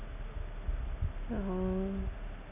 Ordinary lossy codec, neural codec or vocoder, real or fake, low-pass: none; none; real; 3.6 kHz